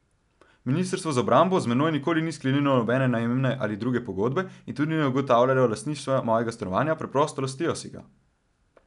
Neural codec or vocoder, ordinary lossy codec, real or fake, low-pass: none; none; real; 10.8 kHz